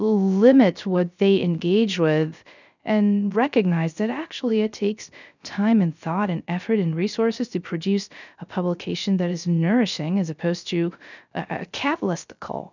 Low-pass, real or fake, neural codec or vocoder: 7.2 kHz; fake; codec, 16 kHz, 0.3 kbps, FocalCodec